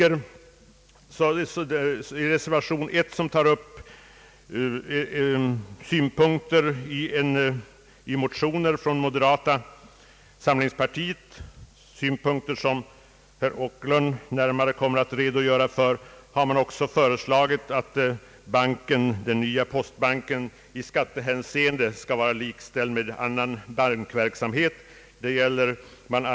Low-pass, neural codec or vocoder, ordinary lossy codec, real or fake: none; none; none; real